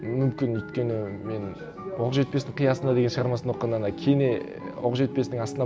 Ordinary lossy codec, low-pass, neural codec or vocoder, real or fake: none; none; none; real